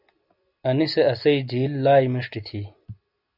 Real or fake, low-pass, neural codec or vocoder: real; 5.4 kHz; none